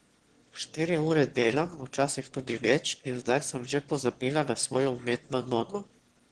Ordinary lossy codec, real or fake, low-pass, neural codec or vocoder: Opus, 16 kbps; fake; 9.9 kHz; autoencoder, 22.05 kHz, a latent of 192 numbers a frame, VITS, trained on one speaker